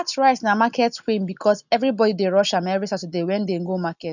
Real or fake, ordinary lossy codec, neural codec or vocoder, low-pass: real; none; none; 7.2 kHz